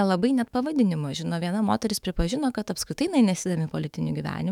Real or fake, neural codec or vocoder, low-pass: fake; autoencoder, 48 kHz, 128 numbers a frame, DAC-VAE, trained on Japanese speech; 19.8 kHz